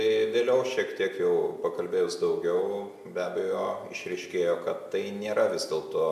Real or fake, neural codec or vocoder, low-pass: real; none; 14.4 kHz